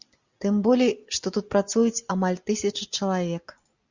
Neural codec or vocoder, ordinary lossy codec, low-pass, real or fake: none; Opus, 64 kbps; 7.2 kHz; real